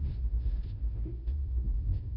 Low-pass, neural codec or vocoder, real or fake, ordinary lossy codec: 5.4 kHz; codec, 16 kHz, 0.5 kbps, FunCodec, trained on Chinese and English, 25 frames a second; fake; none